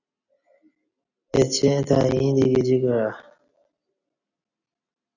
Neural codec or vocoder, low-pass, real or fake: none; 7.2 kHz; real